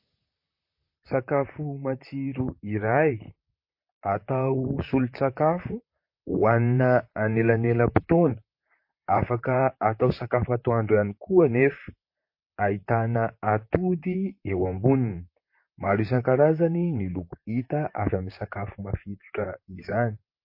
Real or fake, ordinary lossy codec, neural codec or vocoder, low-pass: fake; MP3, 32 kbps; vocoder, 44.1 kHz, 128 mel bands, Pupu-Vocoder; 5.4 kHz